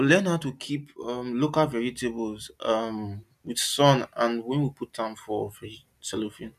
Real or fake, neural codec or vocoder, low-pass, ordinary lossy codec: real; none; 14.4 kHz; none